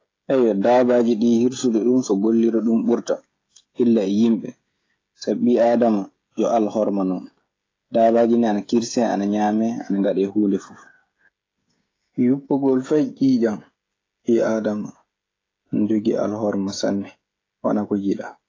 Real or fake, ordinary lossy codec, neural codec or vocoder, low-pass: fake; AAC, 32 kbps; codec, 16 kHz, 16 kbps, FreqCodec, smaller model; 7.2 kHz